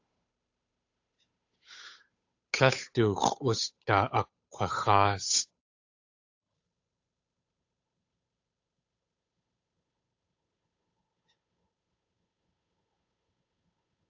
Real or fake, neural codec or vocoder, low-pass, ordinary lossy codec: fake; codec, 16 kHz, 8 kbps, FunCodec, trained on Chinese and English, 25 frames a second; 7.2 kHz; AAC, 48 kbps